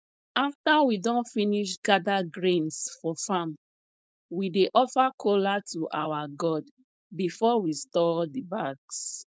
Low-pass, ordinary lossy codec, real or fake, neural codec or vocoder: none; none; fake; codec, 16 kHz, 4.8 kbps, FACodec